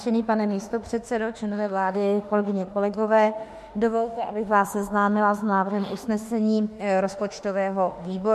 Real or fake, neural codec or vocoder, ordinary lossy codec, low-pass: fake; autoencoder, 48 kHz, 32 numbers a frame, DAC-VAE, trained on Japanese speech; MP3, 64 kbps; 14.4 kHz